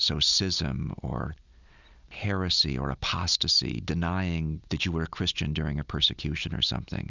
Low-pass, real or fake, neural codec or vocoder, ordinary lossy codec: 7.2 kHz; real; none; Opus, 64 kbps